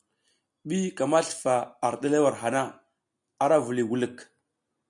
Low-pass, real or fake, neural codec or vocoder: 10.8 kHz; real; none